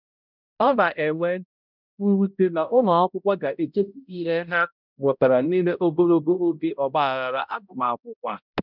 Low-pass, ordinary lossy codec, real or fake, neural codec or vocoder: 5.4 kHz; none; fake; codec, 16 kHz, 0.5 kbps, X-Codec, HuBERT features, trained on balanced general audio